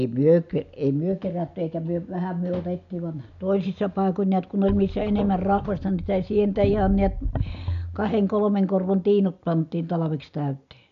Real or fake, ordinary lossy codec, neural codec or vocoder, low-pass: real; none; none; 7.2 kHz